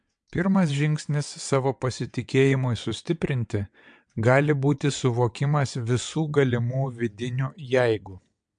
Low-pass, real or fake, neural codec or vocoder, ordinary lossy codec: 9.9 kHz; fake; vocoder, 22.05 kHz, 80 mel bands, WaveNeXt; MP3, 64 kbps